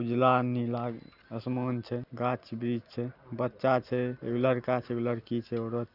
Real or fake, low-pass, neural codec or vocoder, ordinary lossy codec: real; 5.4 kHz; none; none